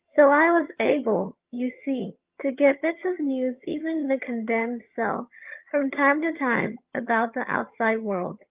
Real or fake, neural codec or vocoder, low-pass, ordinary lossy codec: fake; vocoder, 22.05 kHz, 80 mel bands, HiFi-GAN; 3.6 kHz; Opus, 32 kbps